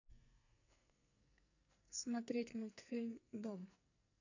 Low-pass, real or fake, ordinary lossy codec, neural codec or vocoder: 7.2 kHz; fake; none; codec, 44.1 kHz, 2.6 kbps, SNAC